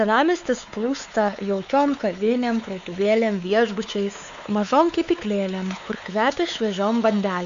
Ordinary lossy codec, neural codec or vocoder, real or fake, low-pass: MP3, 64 kbps; codec, 16 kHz, 4 kbps, X-Codec, WavLM features, trained on Multilingual LibriSpeech; fake; 7.2 kHz